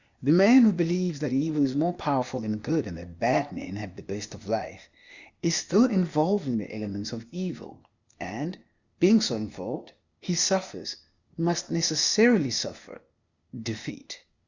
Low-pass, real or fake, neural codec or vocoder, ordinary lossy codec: 7.2 kHz; fake; codec, 16 kHz, 0.8 kbps, ZipCodec; Opus, 64 kbps